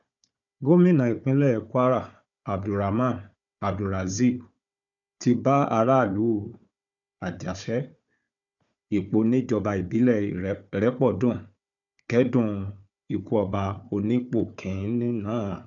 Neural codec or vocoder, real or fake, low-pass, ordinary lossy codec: codec, 16 kHz, 4 kbps, FunCodec, trained on Chinese and English, 50 frames a second; fake; 7.2 kHz; none